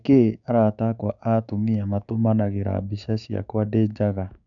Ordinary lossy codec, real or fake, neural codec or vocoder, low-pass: none; fake; codec, 16 kHz, 6 kbps, DAC; 7.2 kHz